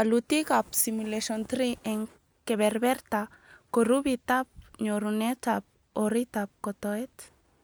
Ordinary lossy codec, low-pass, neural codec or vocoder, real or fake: none; none; none; real